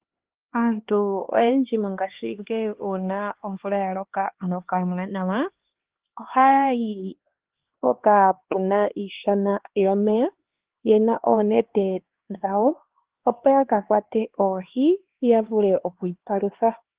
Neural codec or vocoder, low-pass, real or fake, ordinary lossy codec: codec, 16 kHz, 2 kbps, X-Codec, HuBERT features, trained on LibriSpeech; 3.6 kHz; fake; Opus, 16 kbps